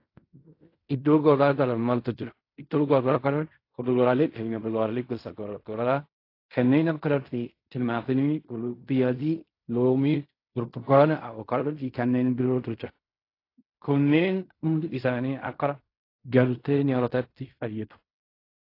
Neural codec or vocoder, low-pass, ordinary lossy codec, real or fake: codec, 16 kHz in and 24 kHz out, 0.4 kbps, LongCat-Audio-Codec, fine tuned four codebook decoder; 5.4 kHz; AAC, 32 kbps; fake